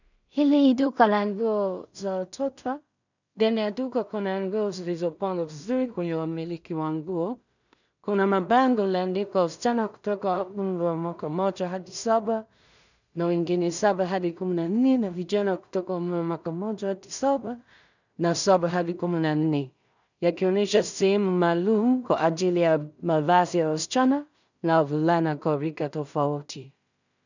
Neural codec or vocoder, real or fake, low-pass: codec, 16 kHz in and 24 kHz out, 0.4 kbps, LongCat-Audio-Codec, two codebook decoder; fake; 7.2 kHz